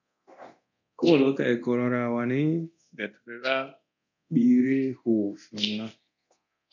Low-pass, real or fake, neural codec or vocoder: 7.2 kHz; fake; codec, 24 kHz, 0.9 kbps, DualCodec